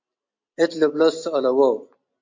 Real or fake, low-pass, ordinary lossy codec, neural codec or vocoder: real; 7.2 kHz; MP3, 48 kbps; none